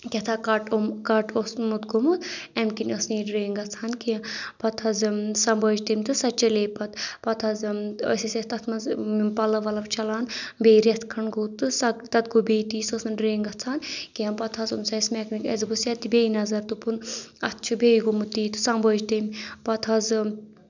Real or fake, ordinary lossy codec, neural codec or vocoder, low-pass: real; none; none; 7.2 kHz